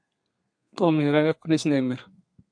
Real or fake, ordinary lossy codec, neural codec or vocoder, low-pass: fake; AAC, 64 kbps; codec, 32 kHz, 1.9 kbps, SNAC; 9.9 kHz